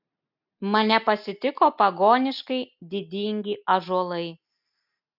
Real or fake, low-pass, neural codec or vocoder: real; 5.4 kHz; none